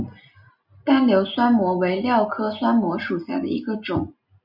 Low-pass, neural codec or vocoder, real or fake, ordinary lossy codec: 5.4 kHz; none; real; Opus, 64 kbps